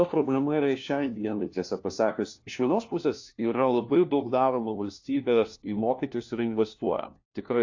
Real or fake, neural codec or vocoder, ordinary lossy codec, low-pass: fake; codec, 16 kHz, 1 kbps, FunCodec, trained on LibriTTS, 50 frames a second; AAC, 48 kbps; 7.2 kHz